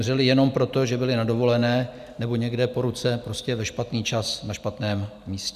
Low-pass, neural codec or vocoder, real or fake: 14.4 kHz; none; real